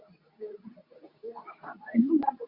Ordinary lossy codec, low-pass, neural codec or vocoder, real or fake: Opus, 64 kbps; 5.4 kHz; codec, 24 kHz, 0.9 kbps, WavTokenizer, medium speech release version 2; fake